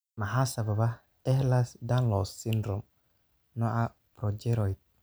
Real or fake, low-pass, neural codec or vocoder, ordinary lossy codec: real; none; none; none